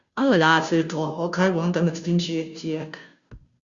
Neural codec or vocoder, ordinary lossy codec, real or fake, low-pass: codec, 16 kHz, 0.5 kbps, FunCodec, trained on Chinese and English, 25 frames a second; Opus, 64 kbps; fake; 7.2 kHz